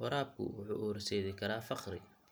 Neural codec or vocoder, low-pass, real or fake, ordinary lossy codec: none; none; real; none